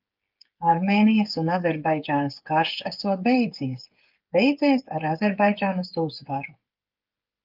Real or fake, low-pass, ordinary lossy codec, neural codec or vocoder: fake; 5.4 kHz; Opus, 24 kbps; codec, 16 kHz, 8 kbps, FreqCodec, smaller model